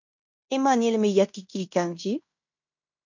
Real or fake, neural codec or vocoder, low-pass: fake; codec, 16 kHz in and 24 kHz out, 0.9 kbps, LongCat-Audio-Codec, fine tuned four codebook decoder; 7.2 kHz